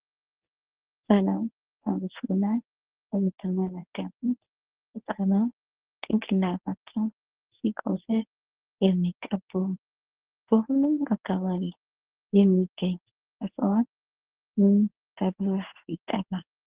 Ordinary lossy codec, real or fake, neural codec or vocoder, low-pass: Opus, 16 kbps; fake; codec, 24 kHz, 0.9 kbps, WavTokenizer, medium speech release version 1; 3.6 kHz